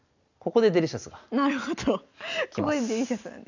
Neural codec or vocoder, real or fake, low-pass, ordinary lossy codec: none; real; 7.2 kHz; none